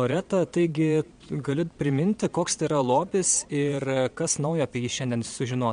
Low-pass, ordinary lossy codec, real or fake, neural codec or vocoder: 9.9 kHz; MP3, 64 kbps; fake; vocoder, 22.05 kHz, 80 mel bands, Vocos